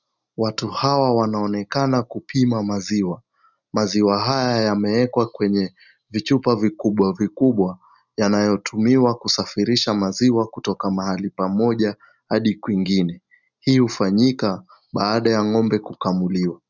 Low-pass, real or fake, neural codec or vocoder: 7.2 kHz; real; none